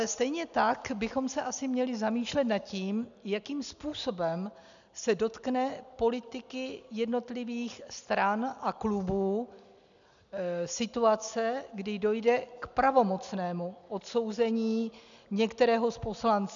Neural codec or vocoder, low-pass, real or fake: none; 7.2 kHz; real